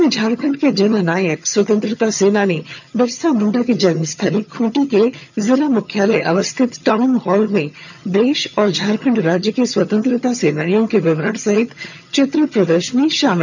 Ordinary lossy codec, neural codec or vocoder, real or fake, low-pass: none; vocoder, 22.05 kHz, 80 mel bands, HiFi-GAN; fake; 7.2 kHz